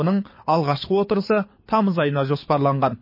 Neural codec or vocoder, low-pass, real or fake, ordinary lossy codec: codec, 24 kHz, 6 kbps, HILCodec; 5.4 kHz; fake; MP3, 24 kbps